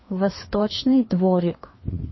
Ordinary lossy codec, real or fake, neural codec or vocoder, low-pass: MP3, 24 kbps; fake; codec, 16 kHz, 2 kbps, FreqCodec, larger model; 7.2 kHz